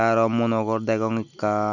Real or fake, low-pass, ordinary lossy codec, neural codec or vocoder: real; 7.2 kHz; none; none